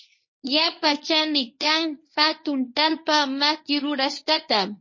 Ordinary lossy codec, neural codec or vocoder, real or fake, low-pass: MP3, 32 kbps; codec, 24 kHz, 0.9 kbps, WavTokenizer, medium speech release version 1; fake; 7.2 kHz